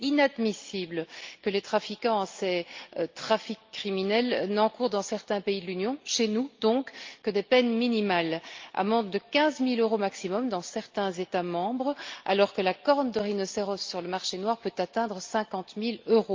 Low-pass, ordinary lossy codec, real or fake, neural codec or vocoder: 7.2 kHz; Opus, 32 kbps; real; none